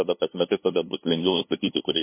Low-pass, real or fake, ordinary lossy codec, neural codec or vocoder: 3.6 kHz; fake; MP3, 24 kbps; codec, 16 kHz, 2 kbps, FunCodec, trained on LibriTTS, 25 frames a second